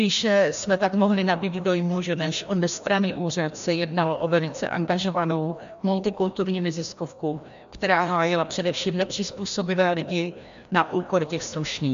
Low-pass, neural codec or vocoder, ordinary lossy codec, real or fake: 7.2 kHz; codec, 16 kHz, 1 kbps, FreqCodec, larger model; MP3, 64 kbps; fake